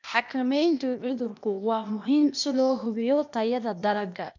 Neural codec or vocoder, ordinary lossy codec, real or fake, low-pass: codec, 16 kHz, 0.8 kbps, ZipCodec; none; fake; 7.2 kHz